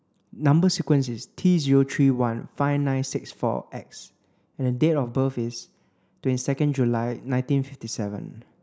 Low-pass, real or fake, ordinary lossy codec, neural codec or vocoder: none; real; none; none